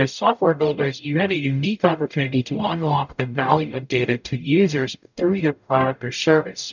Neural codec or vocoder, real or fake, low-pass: codec, 44.1 kHz, 0.9 kbps, DAC; fake; 7.2 kHz